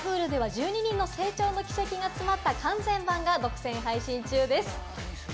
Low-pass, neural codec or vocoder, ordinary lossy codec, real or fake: none; none; none; real